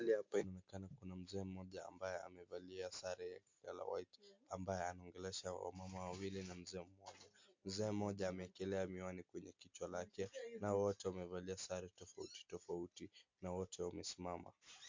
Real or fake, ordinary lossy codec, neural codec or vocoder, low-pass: real; MP3, 48 kbps; none; 7.2 kHz